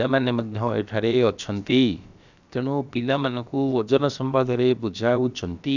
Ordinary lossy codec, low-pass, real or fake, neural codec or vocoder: none; 7.2 kHz; fake; codec, 16 kHz, about 1 kbps, DyCAST, with the encoder's durations